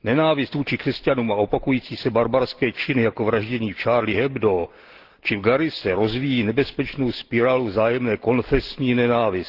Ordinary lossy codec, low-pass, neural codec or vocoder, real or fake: Opus, 16 kbps; 5.4 kHz; none; real